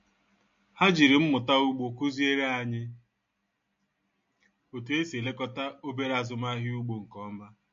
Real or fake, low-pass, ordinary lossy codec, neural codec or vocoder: real; 7.2 kHz; MP3, 48 kbps; none